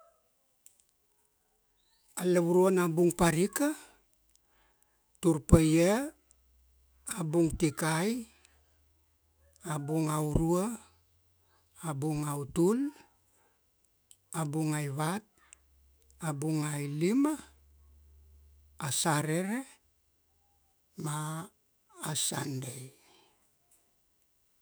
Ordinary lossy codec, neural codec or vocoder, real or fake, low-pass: none; autoencoder, 48 kHz, 128 numbers a frame, DAC-VAE, trained on Japanese speech; fake; none